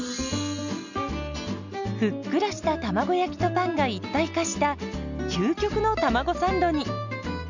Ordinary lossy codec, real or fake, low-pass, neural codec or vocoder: none; real; 7.2 kHz; none